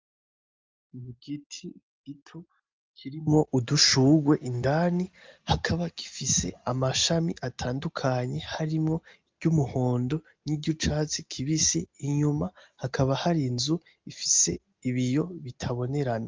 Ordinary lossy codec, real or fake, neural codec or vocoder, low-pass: Opus, 32 kbps; real; none; 7.2 kHz